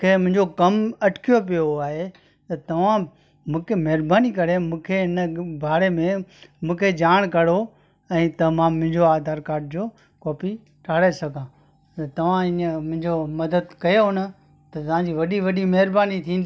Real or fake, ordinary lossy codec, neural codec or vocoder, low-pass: real; none; none; none